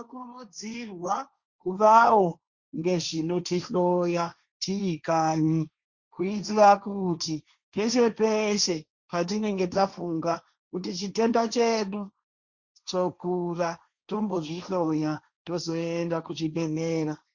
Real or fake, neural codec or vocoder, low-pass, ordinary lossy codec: fake; codec, 16 kHz, 1.1 kbps, Voila-Tokenizer; 7.2 kHz; Opus, 64 kbps